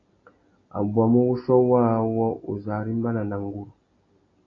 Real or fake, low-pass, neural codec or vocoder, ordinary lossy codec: real; 7.2 kHz; none; AAC, 32 kbps